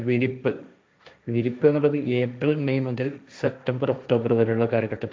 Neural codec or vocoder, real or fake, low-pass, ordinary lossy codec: codec, 16 kHz, 1.1 kbps, Voila-Tokenizer; fake; none; none